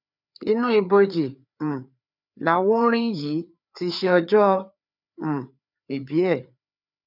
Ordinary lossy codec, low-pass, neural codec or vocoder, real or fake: none; 5.4 kHz; codec, 16 kHz, 4 kbps, FreqCodec, larger model; fake